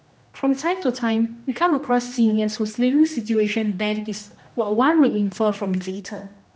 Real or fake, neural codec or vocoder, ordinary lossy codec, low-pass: fake; codec, 16 kHz, 1 kbps, X-Codec, HuBERT features, trained on general audio; none; none